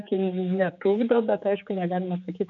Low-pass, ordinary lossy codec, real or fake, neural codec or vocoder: 7.2 kHz; MP3, 96 kbps; fake; codec, 16 kHz, 4 kbps, X-Codec, HuBERT features, trained on general audio